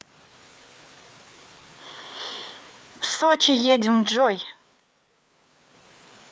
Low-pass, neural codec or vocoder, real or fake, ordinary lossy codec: none; codec, 16 kHz, 4 kbps, FreqCodec, larger model; fake; none